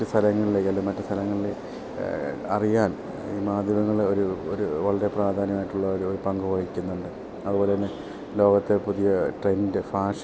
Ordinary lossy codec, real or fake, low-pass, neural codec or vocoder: none; real; none; none